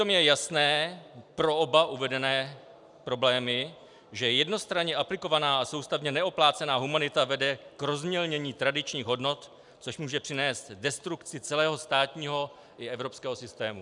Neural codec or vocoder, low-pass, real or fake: none; 10.8 kHz; real